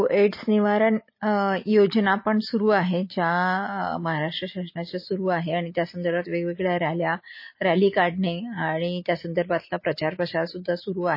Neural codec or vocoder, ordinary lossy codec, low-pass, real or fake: none; MP3, 24 kbps; 5.4 kHz; real